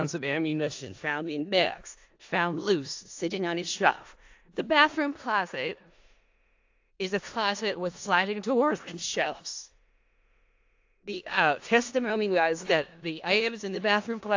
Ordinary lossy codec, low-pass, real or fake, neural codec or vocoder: AAC, 48 kbps; 7.2 kHz; fake; codec, 16 kHz in and 24 kHz out, 0.4 kbps, LongCat-Audio-Codec, four codebook decoder